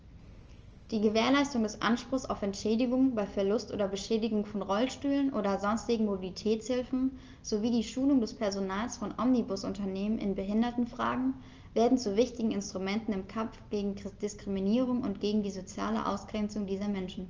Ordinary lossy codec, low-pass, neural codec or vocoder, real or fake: Opus, 24 kbps; 7.2 kHz; none; real